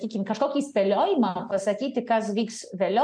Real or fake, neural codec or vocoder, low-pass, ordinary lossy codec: real; none; 9.9 kHz; MP3, 64 kbps